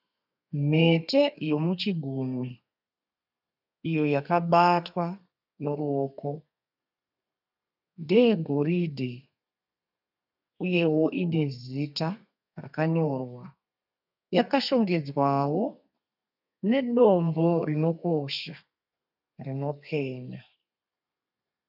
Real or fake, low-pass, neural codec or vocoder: fake; 5.4 kHz; codec, 32 kHz, 1.9 kbps, SNAC